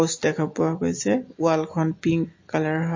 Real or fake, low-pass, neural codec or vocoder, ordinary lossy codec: real; 7.2 kHz; none; MP3, 32 kbps